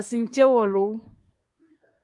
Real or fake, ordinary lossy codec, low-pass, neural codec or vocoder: fake; MP3, 96 kbps; 10.8 kHz; codec, 24 kHz, 1 kbps, SNAC